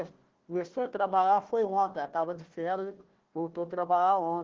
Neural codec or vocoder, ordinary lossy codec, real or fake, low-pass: codec, 16 kHz, 1 kbps, FunCodec, trained on Chinese and English, 50 frames a second; Opus, 16 kbps; fake; 7.2 kHz